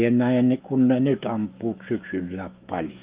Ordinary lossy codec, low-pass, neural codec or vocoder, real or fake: Opus, 32 kbps; 3.6 kHz; autoencoder, 48 kHz, 128 numbers a frame, DAC-VAE, trained on Japanese speech; fake